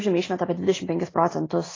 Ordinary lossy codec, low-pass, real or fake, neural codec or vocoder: AAC, 32 kbps; 7.2 kHz; real; none